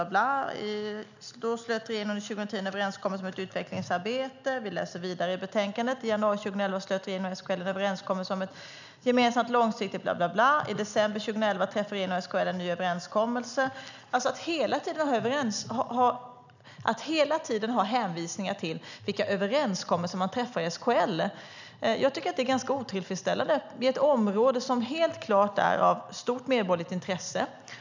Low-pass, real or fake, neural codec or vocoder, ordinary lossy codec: 7.2 kHz; real; none; none